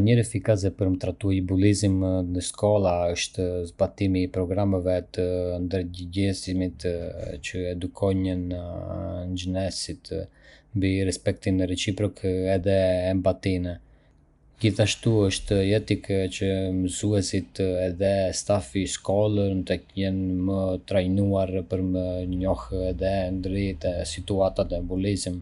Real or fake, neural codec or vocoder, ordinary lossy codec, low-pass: real; none; none; 10.8 kHz